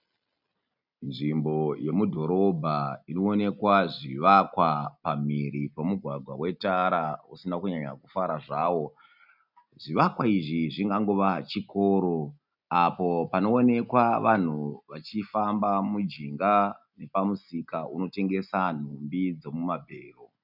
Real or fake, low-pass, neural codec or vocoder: real; 5.4 kHz; none